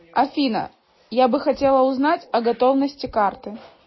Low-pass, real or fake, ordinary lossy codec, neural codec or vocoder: 7.2 kHz; real; MP3, 24 kbps; none